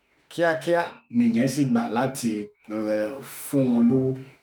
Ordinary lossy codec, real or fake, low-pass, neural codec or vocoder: none; fake; none; autoencoder, 48 kHz, 32 numbers a frame, DAC-VAE, trained on Japanese speech